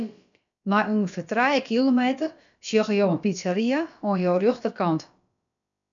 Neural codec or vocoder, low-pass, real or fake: codec, 16 kHz, about 1 kbps, DyCAST, with the encoder's durations; 7.2 kHz; fake